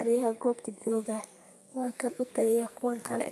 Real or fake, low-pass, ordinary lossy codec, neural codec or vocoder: fake; 14.4 kHz; none; codec, 44.1 kHz, 2.6 kbps, SNAC